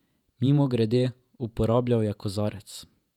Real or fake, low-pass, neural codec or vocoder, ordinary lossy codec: fake; 19.8 kHz; vocoder, 48 kHz, 128 mel bands, Vocos; none